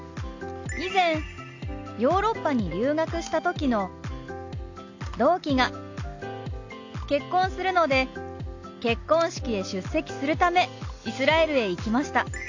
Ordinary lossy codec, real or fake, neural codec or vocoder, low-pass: AAC, 48 kbps; real; none; 7.2 kHz